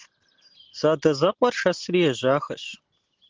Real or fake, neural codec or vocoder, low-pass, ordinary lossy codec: real; none; 7.2 kHz; Opus, 16 kbps